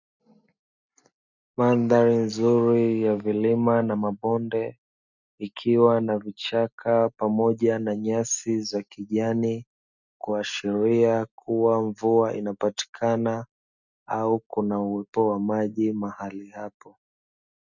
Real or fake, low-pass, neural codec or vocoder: real; 7.2 kHz; none